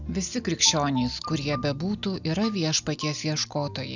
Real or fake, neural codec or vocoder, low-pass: real; none; 7.2 kHz